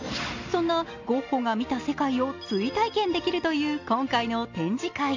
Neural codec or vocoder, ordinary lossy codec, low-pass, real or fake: none; AAC, 48 kbps; 7.2 kHz; real